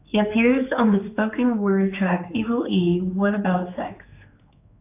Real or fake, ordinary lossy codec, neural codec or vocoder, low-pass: fake; AAC, 24 kbps; codec, 16 kHz, 4 kbps, X-Codec, HuBERT features, trained on general audio; 3.6 kHz